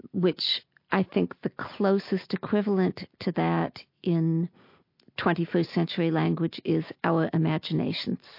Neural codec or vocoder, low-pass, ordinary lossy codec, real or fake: none; 5.4 kHz; MP3, 32 kbps; real